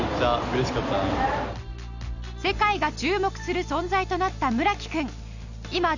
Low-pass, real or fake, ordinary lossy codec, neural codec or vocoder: 7.2 kHz; real; none; none